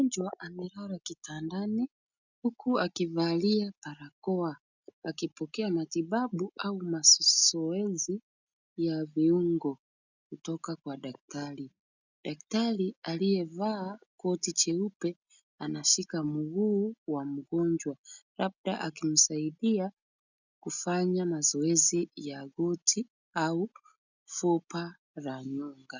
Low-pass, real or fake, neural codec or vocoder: 7.2 kHz; real; none